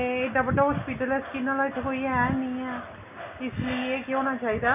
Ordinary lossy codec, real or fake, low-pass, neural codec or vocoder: none; real; 3.6 kHz; none